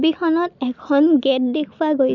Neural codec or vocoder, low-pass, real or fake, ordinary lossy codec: none; 7.2 kHz; real; none